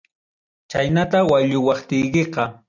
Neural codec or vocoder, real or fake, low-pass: none; real; 7.2 kHz